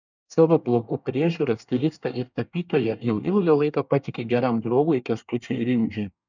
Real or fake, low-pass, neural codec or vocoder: fake; 7.2 kHz; codec, 24 kHz, 1 kbps, SNAC